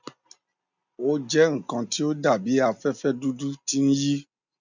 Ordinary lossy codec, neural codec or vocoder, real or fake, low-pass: none; none; real; 7.2 kHz